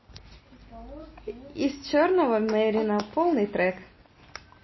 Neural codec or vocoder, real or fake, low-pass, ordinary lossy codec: none; real; 7.2 kHz; MP3, 24 kbps